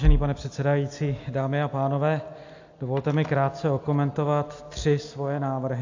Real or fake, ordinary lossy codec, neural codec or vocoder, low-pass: real; AAC, 48 kbps; none; 7.2 kHz